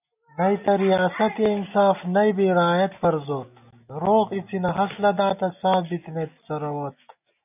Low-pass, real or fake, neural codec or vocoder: 3.6 kHz; real; none